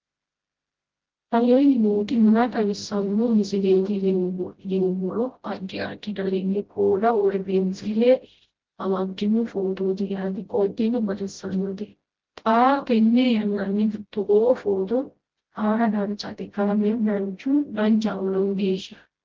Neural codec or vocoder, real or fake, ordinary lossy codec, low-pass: codec, 16 kHz, 0.5 kbps, FreqCodec, smaller model; fake; Opus, 16 kbps; 7.2 kHz